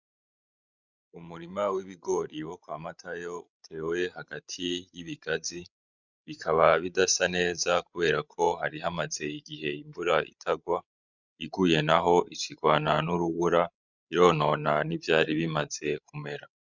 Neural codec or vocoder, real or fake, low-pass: codec, 16 kHz, 16 kbps, FreqCodec, larger model; fake; 7.2 kHz